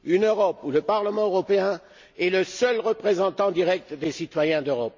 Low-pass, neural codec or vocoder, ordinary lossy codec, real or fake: 7.2 kHz; none; MP3, 48 kbps; real